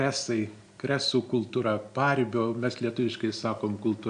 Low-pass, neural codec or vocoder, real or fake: 9.9 kHz; none; real